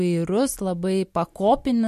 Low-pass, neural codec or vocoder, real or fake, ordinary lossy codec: 14.4 kHz; none; real; MP3, 64 kbps